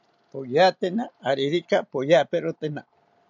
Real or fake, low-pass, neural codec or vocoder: real; 7.2 kHz; none